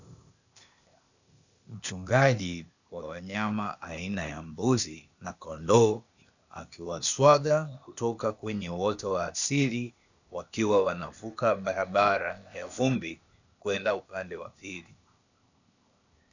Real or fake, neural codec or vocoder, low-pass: fake; codec, 16 kHz, 0.8 kbps, ZipCodec; 7.2 kHz